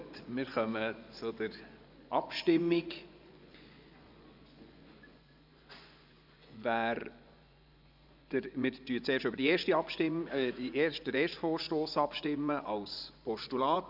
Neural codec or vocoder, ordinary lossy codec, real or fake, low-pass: vocoder, 24 kHz, 100 mel bands, Vocos; none; fake; 5.4 kHz